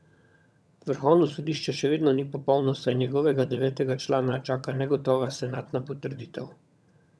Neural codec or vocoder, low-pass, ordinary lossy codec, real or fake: vocoder, 22.05 kHz, 80 mel bands, HiFi-GAN; none; none; fake